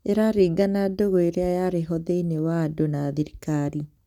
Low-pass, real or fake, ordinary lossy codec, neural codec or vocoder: 19.8 kHz; real; none; none